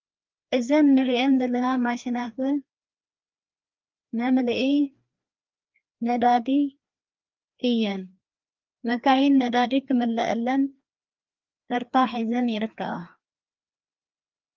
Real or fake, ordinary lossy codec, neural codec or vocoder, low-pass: fake; Opus, 32 kbps; codec, 16 kHz, 2 kbps, FreqCodec, larger model; 7.2 kHz